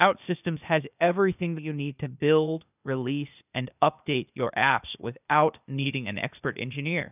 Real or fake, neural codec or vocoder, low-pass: fake; codec, 16 kHz, 0.8 kbps, ZipCodec; 3.6 kHz